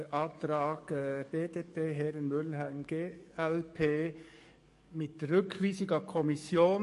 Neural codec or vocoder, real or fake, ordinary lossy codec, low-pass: codec, 44.1 kHz, 7.8 kbps, DAC; fake; MP3, 48 kbps; 14.4 kHz